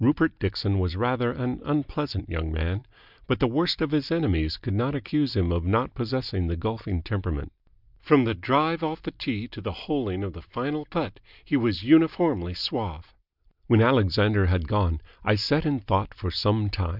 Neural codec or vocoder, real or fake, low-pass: none; real; 5.4 kHz